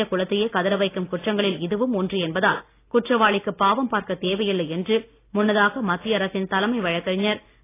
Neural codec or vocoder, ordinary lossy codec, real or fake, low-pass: none; AAC, 24 kbps; real; 3.6 kHz